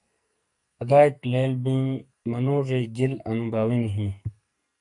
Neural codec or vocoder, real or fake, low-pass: codec, 44.1 kHz, 2.6 kbps, SNAC; fake; 10.8 kHz